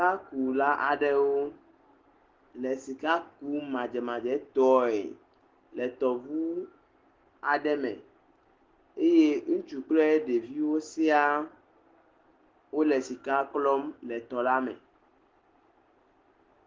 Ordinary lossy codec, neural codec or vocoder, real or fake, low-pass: Opus, 16 kbps; none; real; 7.2 kHz